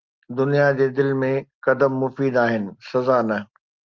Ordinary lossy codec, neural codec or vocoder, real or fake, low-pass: Opus, 24 kbps; none; real; 7.2 kHz